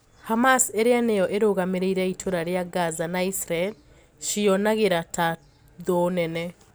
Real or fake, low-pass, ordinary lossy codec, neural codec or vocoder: real; none; none; none